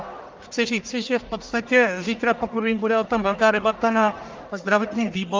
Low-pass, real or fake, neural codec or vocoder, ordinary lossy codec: 7.2 kHz; fake; codec, 44.1 kHz, 1.7 kbps, Pupu-Codec; Opus, 24 kbps